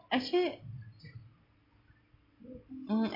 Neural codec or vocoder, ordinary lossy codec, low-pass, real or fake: none; MP3, 32 kbps; 5.4 kHz; real